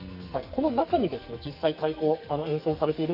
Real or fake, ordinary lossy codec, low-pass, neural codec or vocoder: fake; Opus, 64 kbps; 5.4 kHz; codec, 44.1 kHz, 2.6 kbps, SNAC